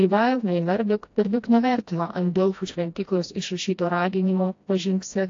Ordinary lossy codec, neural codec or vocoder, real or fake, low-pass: AAC, 48 kbps; codec, 16 kHz, 1 kbps, FreqCodec, smaller model; fake; 7.2 kHz